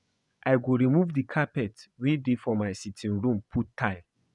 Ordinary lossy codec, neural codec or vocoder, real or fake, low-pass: none; vocoder, 24 kHz, 100 mel bands, Vocos; fake; 10.8 kHz